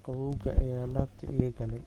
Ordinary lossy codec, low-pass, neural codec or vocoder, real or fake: Opus, 24 kbps; 19.8 kHz; codec, 44.1 kHz, 7.8 kbps, Pupu-Codec; fake